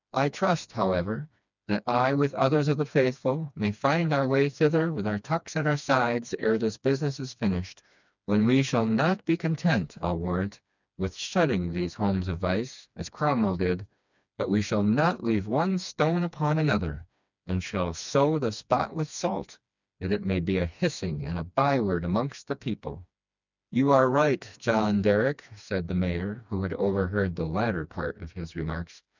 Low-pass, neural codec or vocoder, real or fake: 7.2 kHz; codec, 16 kHz, 2 kbps, FreqCodec, smaller model; fake